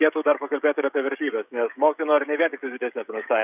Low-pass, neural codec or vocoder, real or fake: 3.6 kHz; codec, 16 kHz, 16 kbps, FreqCodec, smaller model; fake